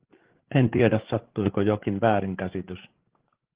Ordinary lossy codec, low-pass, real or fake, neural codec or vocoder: Opus, 16 kbps; 3.6 kHz; fake; codec, 16 kHz, 8 kbps, FreqCodec, larger model